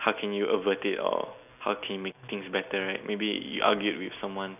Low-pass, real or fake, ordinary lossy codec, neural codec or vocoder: 3.6 kHz; real; none; none